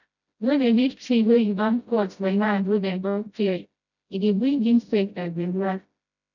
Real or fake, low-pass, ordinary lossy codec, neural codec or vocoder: fake; 7.2 kHz; none; codec, 16 kHz, 0.5 kbps, FreqCodec, smaller model